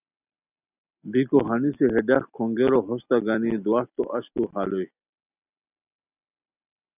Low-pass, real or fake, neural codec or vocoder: 3.6 kHz; real; none